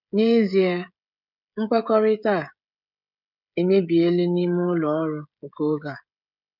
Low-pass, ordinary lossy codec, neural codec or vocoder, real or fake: 5.4 kHz; none; codec, 16 kHz, 16 kbps, FreqCodec, smaller model; fake